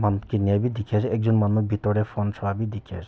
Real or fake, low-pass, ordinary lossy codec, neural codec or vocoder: real; none; none; none